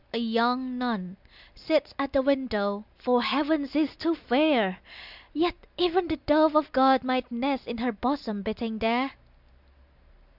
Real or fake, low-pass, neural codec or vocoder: real; 5.4 kHz; none